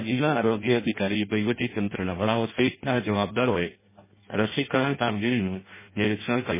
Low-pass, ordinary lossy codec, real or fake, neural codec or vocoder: 3.6 kHz; MP3, 16 kbps; fake; codec, 16 kHz in and 24 kHz out, 0.6 kbps, FireRedTTS-2 codec